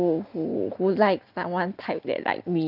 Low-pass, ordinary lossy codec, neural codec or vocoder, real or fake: 5.4 kHz; Opus, 24 kbps; none; real